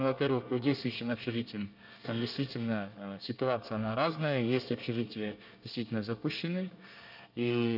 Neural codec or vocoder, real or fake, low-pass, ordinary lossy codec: codec, 24 kHz, 1 kbps, SNAC; fake; 5.4 kHz; none